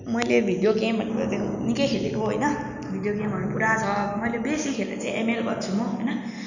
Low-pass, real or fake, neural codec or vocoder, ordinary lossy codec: 7.2 kHz; real; none; none